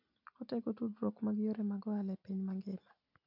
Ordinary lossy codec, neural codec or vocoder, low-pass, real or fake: AAC, 48 kbps; none; 5.4 kHz; real